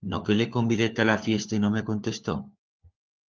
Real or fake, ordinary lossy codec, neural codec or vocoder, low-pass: fake; Opus, 24 kbps; codec, 16 kHz, 4 kbps, FunCodec, trained on LibriTTS, 50 frames a second; 7.2 kHz